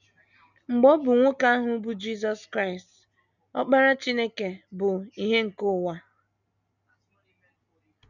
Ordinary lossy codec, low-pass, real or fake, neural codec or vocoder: none; 7.2 kHz; real; none